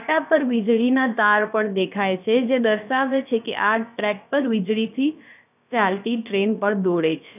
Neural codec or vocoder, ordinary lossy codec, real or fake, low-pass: codec, 16 kHz, about 1 kbps, DyCAST, with the encoder's durations; none; fake; 3.6 kHz